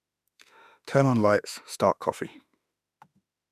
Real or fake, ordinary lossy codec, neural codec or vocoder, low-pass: fake; none; autoencoder, 48 kHz, 32 numbers a frame, DAC-VAE, trained on Japanese speech; 14.4 kHz